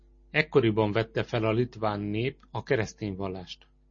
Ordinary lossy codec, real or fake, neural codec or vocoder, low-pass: MP3, 32 kbps; real; none; 7.2 kHz